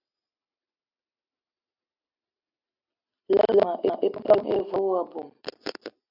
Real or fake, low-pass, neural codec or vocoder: real; 5.4 kHz; none